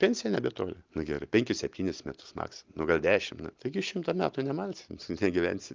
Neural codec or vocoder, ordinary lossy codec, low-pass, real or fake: none; Opus, 32 kbps; 7.2 kHz; real